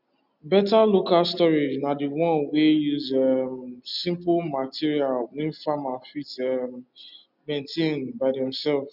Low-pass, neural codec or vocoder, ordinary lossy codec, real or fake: 5.4 kHz; none; none; real